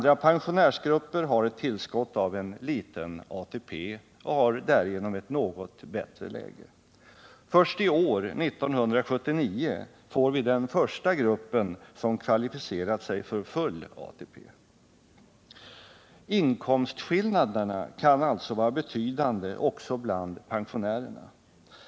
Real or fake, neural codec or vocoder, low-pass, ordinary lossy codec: real; none; none; none